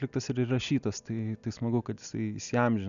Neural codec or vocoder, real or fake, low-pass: none; real; 7.2 kHz